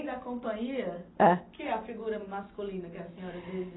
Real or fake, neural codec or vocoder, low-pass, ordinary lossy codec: real; none; 7.2 kHz; AAC, 16 kbps